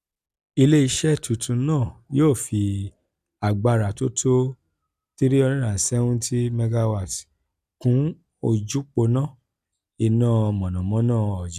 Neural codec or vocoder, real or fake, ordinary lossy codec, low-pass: none; real; none; 14.4 kHz